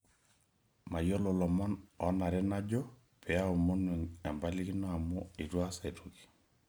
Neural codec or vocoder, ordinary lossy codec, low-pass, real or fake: none; none; none; real